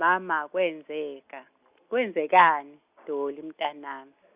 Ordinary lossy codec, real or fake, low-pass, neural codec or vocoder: Opus, 64 kbps; real; 3.6 kHz; none